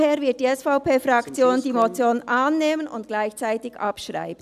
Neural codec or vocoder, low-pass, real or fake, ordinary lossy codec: none; 14.4 kHz; real; none